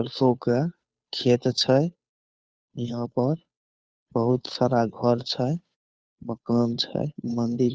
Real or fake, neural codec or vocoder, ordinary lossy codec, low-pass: fake; codec, 16 kHz, 2 kbps, FunCodec, trained on Chinese and English, 25 frames a second; none; none